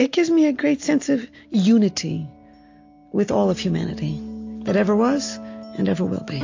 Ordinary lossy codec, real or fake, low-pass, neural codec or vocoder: AAC, 48 kbps; real; 7.2 kHz; none